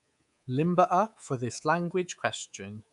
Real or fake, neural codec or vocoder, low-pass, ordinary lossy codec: fake; codec, 24 kHz, 3.1 kbps, DualCodec; 10.8 kHz; none